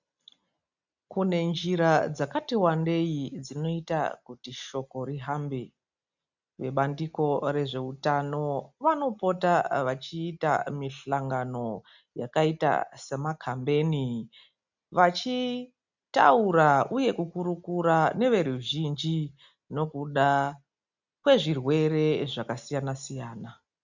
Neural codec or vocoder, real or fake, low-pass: none; real; 7.2 kHz